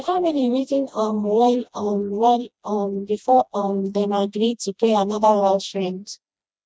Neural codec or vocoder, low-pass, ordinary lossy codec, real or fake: codec, 16 kHz, 1 kbps, FreqCodec, smaller model; none; none; fake